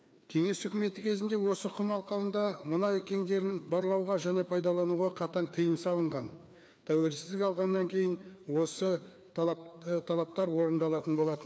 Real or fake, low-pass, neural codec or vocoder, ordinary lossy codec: fake; none; codec, 16 kHz, 2 kbps, FreqCodec, larger model; none